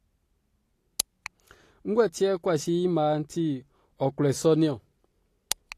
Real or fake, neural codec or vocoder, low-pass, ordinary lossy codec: real; none; 14.4 kHz; AAC, 48 kbps